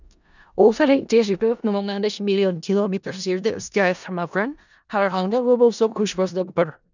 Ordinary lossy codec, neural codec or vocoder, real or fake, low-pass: none; codec, 16 kHz in and 24 kHz out, 0.4 kbps, LongCat-Audio-Codec, four codebook decoder; fake; 7.2 kHz